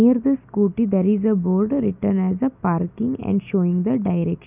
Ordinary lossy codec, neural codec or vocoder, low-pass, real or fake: none; none; 3.6 kHz; real